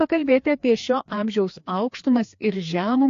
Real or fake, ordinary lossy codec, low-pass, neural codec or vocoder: fake; AAC, 48 kbps; 7.2 kHz; codec, 16 kHz, 2 kbps, FreqCodec, larger model